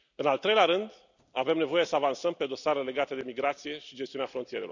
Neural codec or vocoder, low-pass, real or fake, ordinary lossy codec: none; 7.2 kHz; real; none